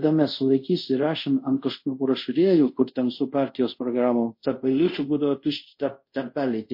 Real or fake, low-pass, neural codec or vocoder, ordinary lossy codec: fake; 5.4 kHz; codec, 24 kHz, 0.5 kbps, DualCodec; MP3, 32 kbps